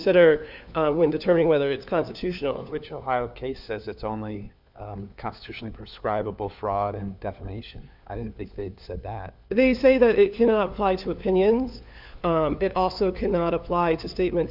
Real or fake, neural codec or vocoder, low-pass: fake; codec, 16 kHz, 4 kbps, FunCodec, trained on LibriTTS, 50 frames a second; 5.4 kHz